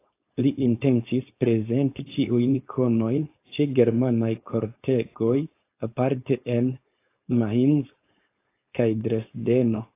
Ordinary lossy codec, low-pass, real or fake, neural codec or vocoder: AAC, 24 kbps; 3.6 kHz; fake; codec, 16 kHz, 4.8 kbps, FACodec